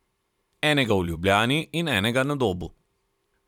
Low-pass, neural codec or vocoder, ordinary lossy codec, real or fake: 19.8 kHz; vocoder, 44.1 kHz, 128 mel bands every 512 samples, BigVGAN v2; none; fake